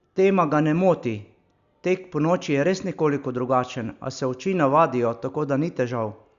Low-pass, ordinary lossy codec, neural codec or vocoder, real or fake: 7.2 kHz; Opus, 64 kbps; none; real